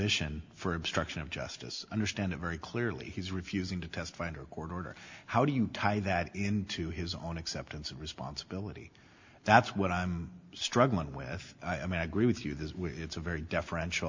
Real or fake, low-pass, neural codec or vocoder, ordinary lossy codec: real; 7.2 kHz; none; MP3, 64 kbps